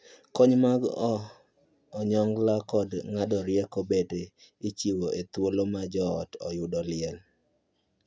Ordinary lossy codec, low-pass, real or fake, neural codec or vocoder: none; none; real; none